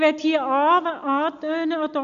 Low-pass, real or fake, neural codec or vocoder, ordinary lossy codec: 7.2 kHz; real; none; none